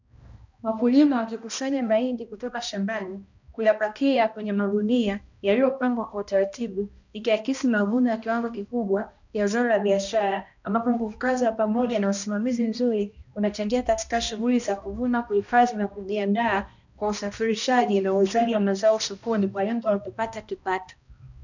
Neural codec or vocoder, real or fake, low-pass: codec, 16 kHz, 1 kbps, X-Codec, HuBERT features, trained on balanced general audio; fake; 7.2 kHz